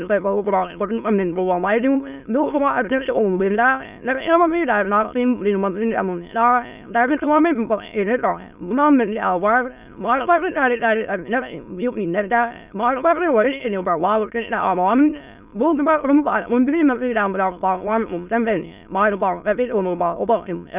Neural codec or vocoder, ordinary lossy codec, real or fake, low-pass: autoencoder, 22.05 kHz, a latent of 192 numbers a frame, VITS, trained on many speakers; none; fake; 3.6 kHz